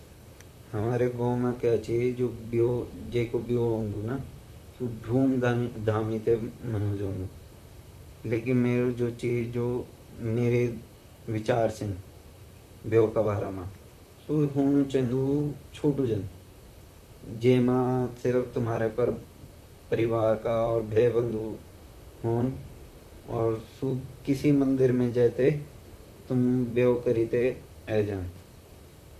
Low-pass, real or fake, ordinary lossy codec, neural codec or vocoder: 14.4 kHz; fake; none; vocoder, 44.1 kHz, 128 mel bands, Pupu-Vocoder